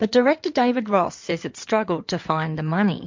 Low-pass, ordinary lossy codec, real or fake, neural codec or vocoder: 7.2 kHz; MP3, 48 kbps; fake; codec, 16 kHz in and 24 kHz out, 2.2 kbps, FireRedTTS-2 codec